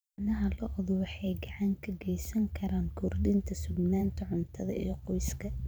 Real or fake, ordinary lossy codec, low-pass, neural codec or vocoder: fake; none; none; vocoder, 44.1 kHz, 128 mel bands every 512 samples, BigVGAN v2